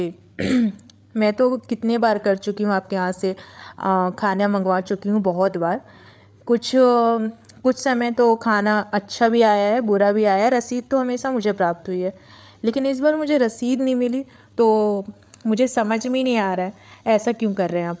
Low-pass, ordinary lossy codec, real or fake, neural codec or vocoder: none; none; fake; codec, 16 kHz, 8 kbps, FreqCodec, larger model